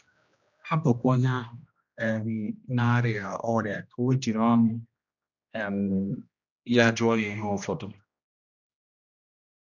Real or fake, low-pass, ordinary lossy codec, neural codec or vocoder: fake; 7.2 kHz; none; codec, 16 kHz, 1 kbps, X-Codec, HuBERT features, trained on general audio